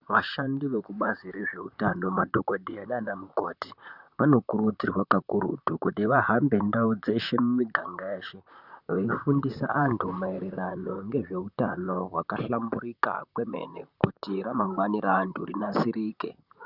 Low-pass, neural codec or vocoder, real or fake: 5.4 kHz; vocoder, 44.1 kHz, 128 mel bands, Pupu-Vocoder; fake